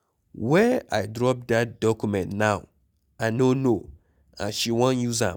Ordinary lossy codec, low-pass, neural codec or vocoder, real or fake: none; 19.8 kHz; none; real